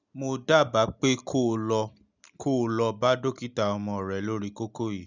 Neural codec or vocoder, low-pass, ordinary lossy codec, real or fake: none; 7.2 kHz; none; real